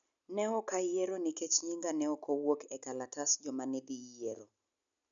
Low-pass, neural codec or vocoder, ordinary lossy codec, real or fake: 7.2 kHz; none; none; real